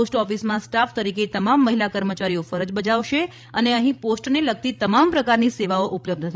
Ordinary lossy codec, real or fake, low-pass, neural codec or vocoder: none; fake; none; codec, 16 kHz, 16 kbps, FreqCodec, larger model